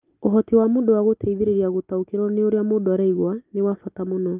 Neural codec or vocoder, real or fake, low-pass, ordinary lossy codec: none; real; 3.6 kHz; Opus, 32 kbps